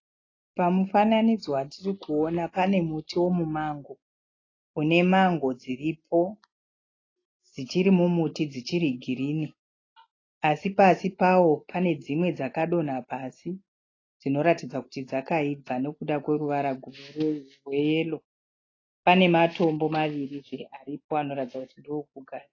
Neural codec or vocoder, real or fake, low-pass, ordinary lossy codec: none; real; 7.2 kHz; AAC, 32 kbps